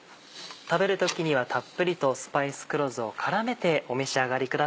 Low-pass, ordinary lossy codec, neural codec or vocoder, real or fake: none; none; none; real